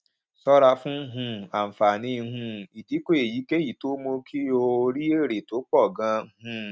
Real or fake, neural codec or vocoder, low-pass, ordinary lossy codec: real; none; none; none